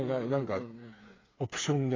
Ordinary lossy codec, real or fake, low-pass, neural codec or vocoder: AAC, 32 kbps; fake; 7.2 kHz; codec, 16 kHz, 8 kbps, FreqCodec, smaller model